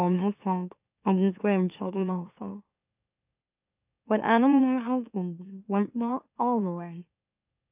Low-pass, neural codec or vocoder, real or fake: 3.6 kHz; autoencoder, 44.1 kHz, a latent of 192 numbers a frame, MeloTTS; fake